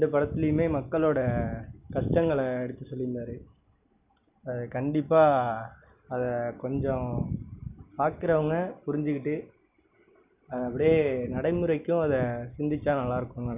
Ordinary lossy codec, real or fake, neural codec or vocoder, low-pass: none; real; none; 3.6 kHz